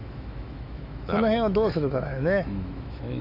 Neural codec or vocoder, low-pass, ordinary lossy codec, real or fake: autoencoder, 48 kHz, 128 numbers a frame, DAC-VAE, trained on Japanese speech; 5.4 kHz; none; fake